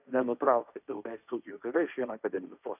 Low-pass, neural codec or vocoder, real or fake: 3.6 kHz; codec, 16 kHz, 1.1 kbps, Voila-Tokenizer; fake